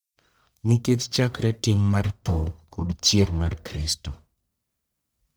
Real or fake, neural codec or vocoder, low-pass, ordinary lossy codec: fake; codec, 44.1 kHz, 1.7 kbps, Pupu-Codec; none; none